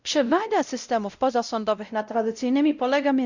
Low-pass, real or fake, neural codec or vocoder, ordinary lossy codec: 7.2 kHz; fake; codec, 16 kHz, 0.5 kbps, X-Codec, WavLM features, trained on Multilingual LibriSpeech; Opus, 64 kbps